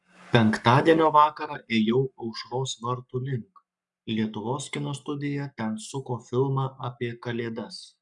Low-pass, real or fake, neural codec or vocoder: 10.8 kHz; fake; codec, 44.1 kHz, 7.8 kbps, Pupu-Codec